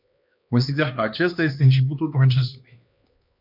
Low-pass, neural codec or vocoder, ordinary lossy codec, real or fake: 5.4 kHz; codec, 16 kHz, 2 kbps, X-Codec, HuBERT features, trained on LibriSpeech; MP3, 48 kbps; fake